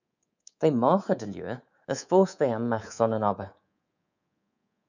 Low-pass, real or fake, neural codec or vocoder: 7.2 kHz; fake; codec, 24 kHz, 3.1 kbps, DualCodec